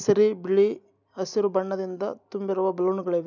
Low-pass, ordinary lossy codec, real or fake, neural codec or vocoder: 7.2 kHz; none; real; none